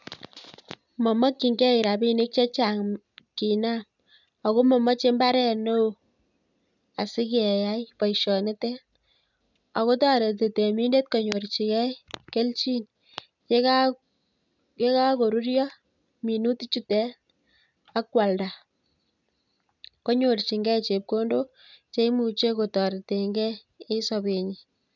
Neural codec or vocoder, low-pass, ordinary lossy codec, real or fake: none; 7.2 kHz; none; real